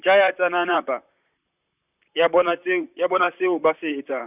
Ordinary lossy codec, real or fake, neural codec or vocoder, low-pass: none; fake; vocoder, 44.1 kHz, 128 mel bands, Pupu-Vocoder; 3.6 kHz